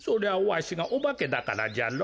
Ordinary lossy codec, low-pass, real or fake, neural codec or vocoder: none; none; real; none